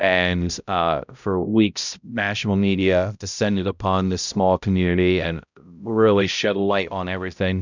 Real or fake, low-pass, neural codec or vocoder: fake; 7.2 kHz; codec, 16 kHz, 0.5 kbps, X-Codec, HuBERT features, trained on balanced general audio